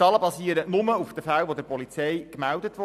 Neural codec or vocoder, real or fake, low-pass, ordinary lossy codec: none; real; 14.4 kHz; none